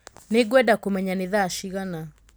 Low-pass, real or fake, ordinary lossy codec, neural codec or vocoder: none; real; none; none